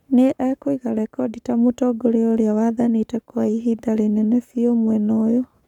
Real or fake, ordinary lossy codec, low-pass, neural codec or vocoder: fake; none; 19.8 kHz; codec, 44.1 kHz, 7.8 kbps, Pupu-Codec